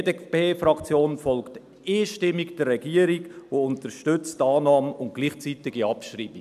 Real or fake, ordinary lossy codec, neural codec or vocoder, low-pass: real; none; none; 14.4 kHz